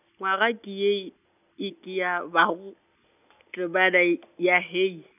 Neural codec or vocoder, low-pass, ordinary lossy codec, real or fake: none; 3.6 kHz; none; real